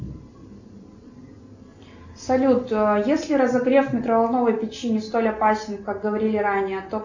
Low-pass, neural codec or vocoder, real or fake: 7.2 kHz; none; real